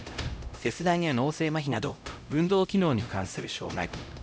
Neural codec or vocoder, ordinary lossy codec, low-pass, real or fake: codec, 16 kHz, 0.5 kbps, X-Codec, HuBERT features, trained on LibriSpeech; none; none; fake